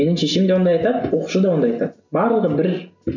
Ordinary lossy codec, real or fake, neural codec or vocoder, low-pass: none; real; none; 7.2 kHz